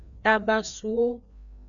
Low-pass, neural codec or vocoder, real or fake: 7.2 kHz; codec, 16 kHz, 2 kbps, FreqCodec, larger model; fake